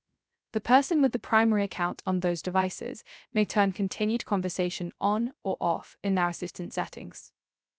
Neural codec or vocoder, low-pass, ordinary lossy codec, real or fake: codec, 16 kHz, 0.3 kbps, FocalCodec; none; none; fake